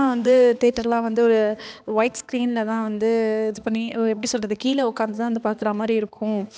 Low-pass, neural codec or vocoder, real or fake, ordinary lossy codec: none; codec, 16 kHz, 2 kbps, X-Codec, HuBERT features, trained on balanced general audio; fake; none